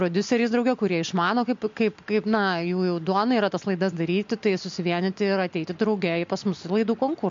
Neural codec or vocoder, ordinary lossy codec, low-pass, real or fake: none; MP3, 48 kbps; 7.2 kHz; real